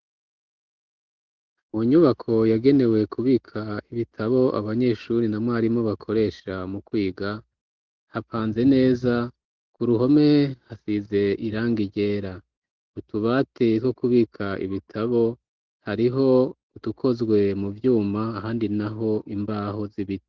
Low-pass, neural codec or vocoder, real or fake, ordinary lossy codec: 7.2 kHz; none; real; Opus, 16 kbps